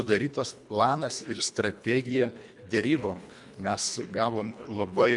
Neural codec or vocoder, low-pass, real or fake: codec, 24 kHz, 1.5 kbps, HILCodec; 10.8 kHz; fake